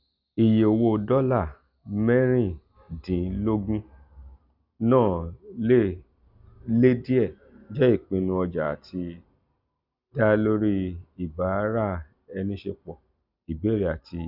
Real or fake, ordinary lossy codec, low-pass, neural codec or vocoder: real; none; 5.4 kHz; none